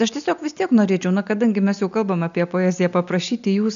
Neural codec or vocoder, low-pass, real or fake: none; 7.2 kHz; real